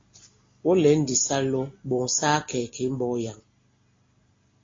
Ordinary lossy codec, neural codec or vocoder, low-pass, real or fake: AAC, 32 kbps; none; 7.2 kHz; real